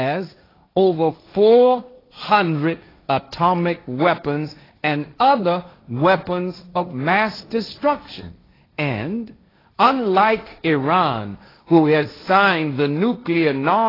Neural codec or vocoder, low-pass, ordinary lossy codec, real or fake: codec, 16 kHz, 1.1 kbps, Voila-Tokenizer; 5.4 kHz; AAC, 24 kbps; fake